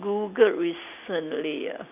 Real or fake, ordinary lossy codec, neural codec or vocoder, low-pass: real; none; none; 3.6 kHz